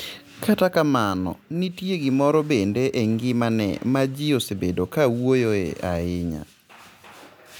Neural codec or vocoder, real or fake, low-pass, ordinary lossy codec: none; real; none; none